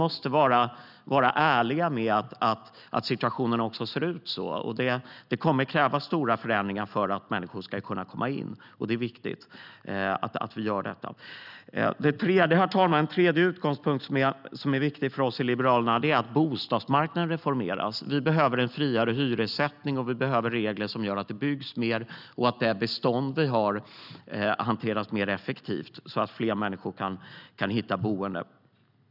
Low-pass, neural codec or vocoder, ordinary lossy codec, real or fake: 5.4 kHz; none; none; real